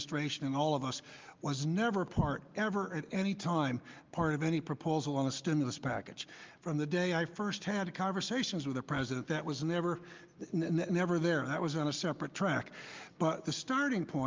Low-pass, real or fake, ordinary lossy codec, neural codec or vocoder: 7.2 kHz; real; Opus, 24 kbps; none